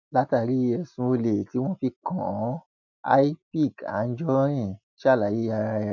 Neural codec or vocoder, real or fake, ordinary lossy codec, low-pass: none; real; none; 7.2 kHz